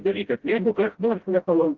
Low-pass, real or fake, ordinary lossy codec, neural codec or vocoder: 7.2 kHz; fake; Opus, 16 kbps; codec, 16 kHz, 0.5 kbps, FreqCodec, smaller model